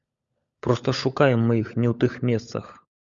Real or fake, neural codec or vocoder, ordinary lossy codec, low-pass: fake; codec, 16 kHz, 16 kbps, FunCodec, trained on LibriTTS, 50 frames a second; Opus, 64 kbps; 7.2 kHz